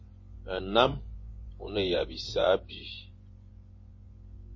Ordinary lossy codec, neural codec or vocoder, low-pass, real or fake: MP3, 32 kbps; vocoder, 24 kHz, 100 mel bands, Vocos; 7.2 kHz; fake